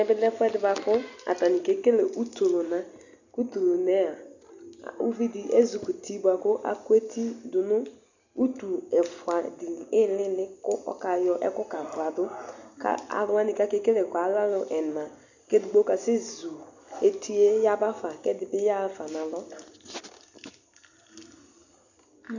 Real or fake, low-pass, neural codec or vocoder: real; 7.2 kHz; none